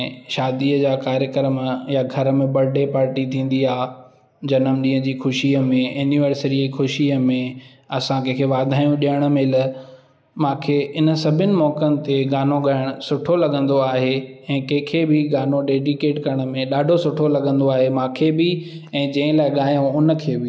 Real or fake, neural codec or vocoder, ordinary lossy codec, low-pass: real; none; none; none